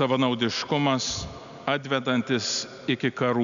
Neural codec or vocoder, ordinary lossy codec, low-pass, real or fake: none; MP3, 96 kbps; 7.2 kHz; real